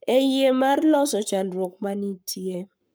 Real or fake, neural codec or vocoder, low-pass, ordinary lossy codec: fake; codec, 44.1 kHz, 7.8 kbps, Pupu-Codec; none; none